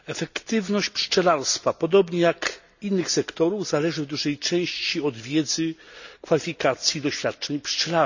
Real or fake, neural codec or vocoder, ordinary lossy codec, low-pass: real; none; none; 7.2 kHz